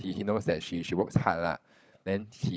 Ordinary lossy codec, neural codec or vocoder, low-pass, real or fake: none; codec, 16 kHz, 16 kbps, FunCodec, trained on LibriTTS, 50 frames a second; none; fake